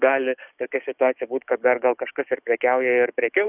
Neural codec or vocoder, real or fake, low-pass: codec, 44.1 kHz, 7.8 kbps, DAC; fake; 3.6 kHz